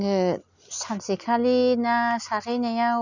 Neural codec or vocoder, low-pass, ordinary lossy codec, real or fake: none; 7.2 kHz; none; real